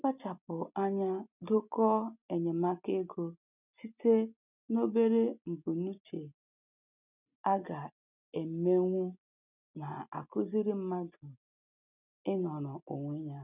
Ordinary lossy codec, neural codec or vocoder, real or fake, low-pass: none; none; real; 3.6 kHz